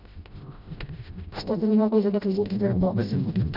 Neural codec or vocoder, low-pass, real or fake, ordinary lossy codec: codec, 16 kHz, 0.5 kbps, FreqCodec, smaller model; 5.4 kHz; fake; none